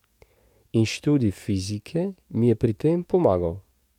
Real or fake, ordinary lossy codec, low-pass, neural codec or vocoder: fake; MP3, 96 kbps; 19.8 kHz; codec, 44.1 kHz, 7.8 kbps, DAC